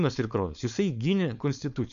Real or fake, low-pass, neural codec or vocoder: fake; 7.2 kHz; codec, 16 kHz, 4.8 kbps, FACodec